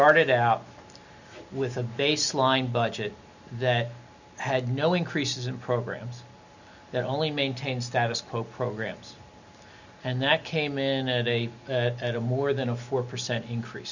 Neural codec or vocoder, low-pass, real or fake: none; 7.2 kHz; real